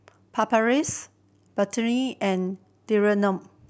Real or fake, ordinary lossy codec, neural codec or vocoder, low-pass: real; none; none; none